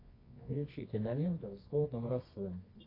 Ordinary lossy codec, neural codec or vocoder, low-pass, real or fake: AAC, 24 kbps; codec, 24 kHz, 0.9 kbps, WavTokenizer, medium music audio release; 5.4 kHz; fake